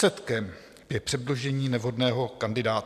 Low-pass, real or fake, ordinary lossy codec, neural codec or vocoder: 14.4 kHz; real; AAC, 96 kbps; none